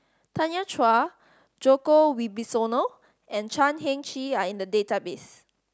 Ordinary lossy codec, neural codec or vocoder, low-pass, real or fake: none; none; none; real